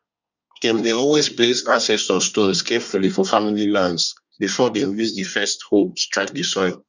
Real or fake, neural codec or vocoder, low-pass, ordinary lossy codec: fake; codec, 24 kHz, 1 kbps, SNAC; 7.2 kHz; none